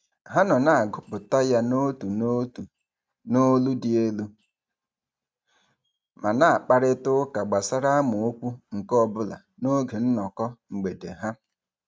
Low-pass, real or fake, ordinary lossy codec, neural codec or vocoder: none; real; none; none